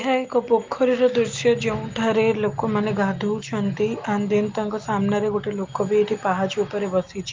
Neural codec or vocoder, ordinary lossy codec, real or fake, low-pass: none; Opus, 32 kbps; real; 7.2 kHz